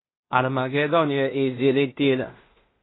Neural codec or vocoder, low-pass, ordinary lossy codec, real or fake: codec, 16 kHz in and 24 kHz out, 0.4 kbps, LongCat-Audio-Codec, two codebook decoder; 7.2 kHz; AAC, 16 kbps; fake